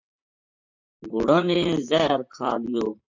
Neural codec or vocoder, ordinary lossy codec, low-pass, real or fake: vocoder, 44.1 kHz, 128 mel bands, Pupu-Vocoder; MP3, 64 kbps; 7.2 kHz; fake